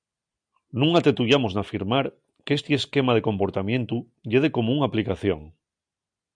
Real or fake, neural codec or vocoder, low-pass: real; none; 9.9 kHz